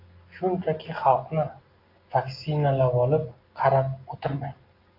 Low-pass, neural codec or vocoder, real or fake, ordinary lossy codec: 5.4 kHz; none; real; AAC, 32 kbps